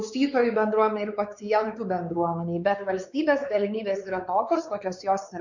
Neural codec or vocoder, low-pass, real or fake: codec, 16 kHz, 4 kbps, X-Codec, WavLM features, trained on Multilingual LibriSpeech; 7.2 kHz; fake